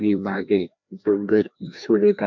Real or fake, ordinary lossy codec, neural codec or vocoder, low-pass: fake; MP3, 64 kbps; codec, 16 kHz, 1 kbps, FreqCodec, larger model; 7.2 kHz